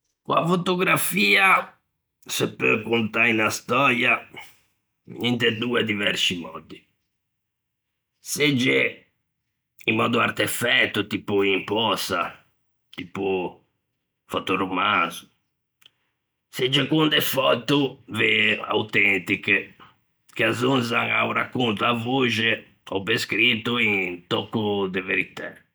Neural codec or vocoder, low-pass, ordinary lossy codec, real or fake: none; none; none; real